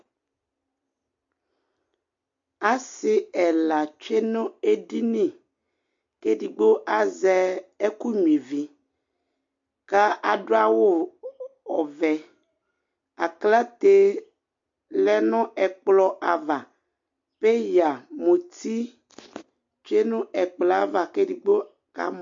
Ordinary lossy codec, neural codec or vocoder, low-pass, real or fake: MP3, 48 kbps; none; 7.2 kHz; real